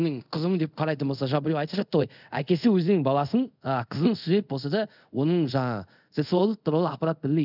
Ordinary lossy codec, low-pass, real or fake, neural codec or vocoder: none; 5.4 kHz; fake; codec, 24 kHz, 0.5 kbps, DualCodec